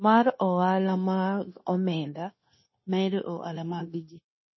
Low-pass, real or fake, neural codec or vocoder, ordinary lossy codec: 7.2 kHz; fake; codec, 16 kHz, 0.9 kbps, LongCat-Audio-Codec; MP3, 24 kbps